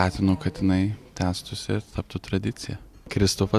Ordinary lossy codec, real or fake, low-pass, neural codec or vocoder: Opus, 64 kbps; real; 14.4 kHz; none